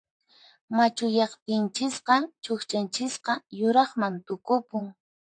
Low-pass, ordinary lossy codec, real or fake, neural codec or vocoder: 9.9 kHz; AAC, 64 kbps; fake; vocoder, 44.1 kHz, 128 mel bands, Pupu-Vocoder